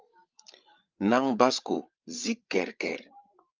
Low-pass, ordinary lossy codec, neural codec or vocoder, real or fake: 7.2 kHz; Opus, 24 kbps; codec, 16 kHz, 8 kbps, FreqCodec, larger model; fake